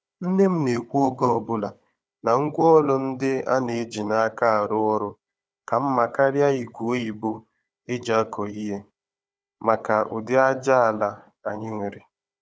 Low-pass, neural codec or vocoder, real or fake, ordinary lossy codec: none; codec, 16 kHz, 4 kbps, FunCodec, trained on Chinese and English, 50 frames a second; fake; none